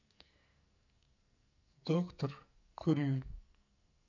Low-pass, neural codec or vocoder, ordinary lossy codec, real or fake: 7.2 kHz; codec, 44.1 kHz, 2.6 kbps, SNAC; none; fake